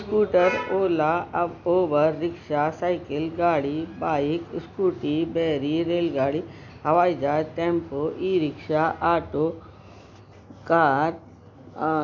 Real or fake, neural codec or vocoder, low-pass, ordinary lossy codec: real; none; 7.2 kHz; none